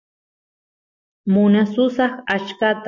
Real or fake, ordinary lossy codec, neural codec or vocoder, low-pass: real; MP3, 48 kbps; none; 7.2 kHz